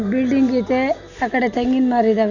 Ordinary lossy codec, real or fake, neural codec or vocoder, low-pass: none; real; none; 7.2 kHz